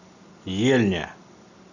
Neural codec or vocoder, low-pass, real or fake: none; 7.2 kHz; real